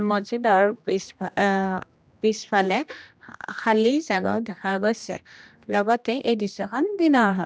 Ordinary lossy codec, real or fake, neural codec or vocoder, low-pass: none; fake; codec, 16 kHz, 1 kbps, X-Codec, HuBERT features, trained on general audio; none